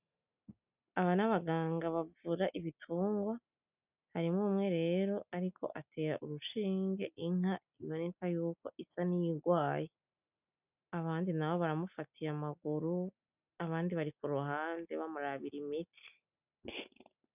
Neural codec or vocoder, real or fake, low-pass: none; real; 3.6 kHz